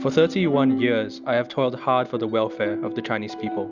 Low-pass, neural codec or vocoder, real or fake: 7.2 kHz; none; real